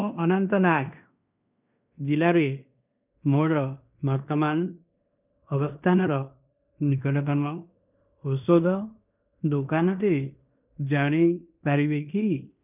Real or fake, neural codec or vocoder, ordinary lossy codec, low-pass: fake; codec, 16 kHz in and 24 kHz out, 0.9 kbps, LongCat-Audio-Codec, fine tuned four codebook decoder; none; 3.6 kHz